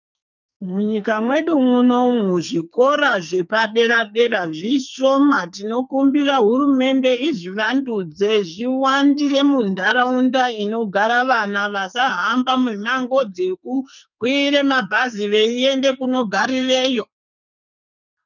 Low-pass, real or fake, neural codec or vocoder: 7.2 kHz; fake; codec, 44.1 kHz, 2.6 kbps, SNAC